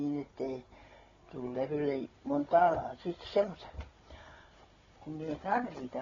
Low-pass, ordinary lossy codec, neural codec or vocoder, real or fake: 7.2 kHz; AAC, 24 kbps; codec, 16 kHz, 16 kbps, FunCodec, trained on Chinese and English, 50 frames a second; fake